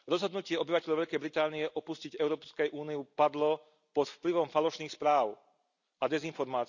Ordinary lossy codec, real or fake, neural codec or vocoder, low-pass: none; real; none; 7.2 kHz